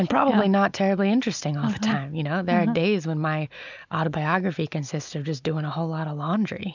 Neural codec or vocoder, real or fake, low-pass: none; real; 7.2 kHz